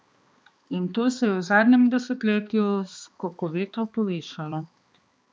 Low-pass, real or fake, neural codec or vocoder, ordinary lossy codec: none; fake; codec, 16 kHz, 2 kbps, X-Codec, HuBERT features, trained on balanced general audio; none